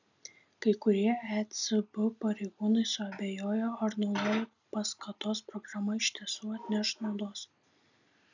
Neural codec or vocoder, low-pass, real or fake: none; 7.2 kHz; real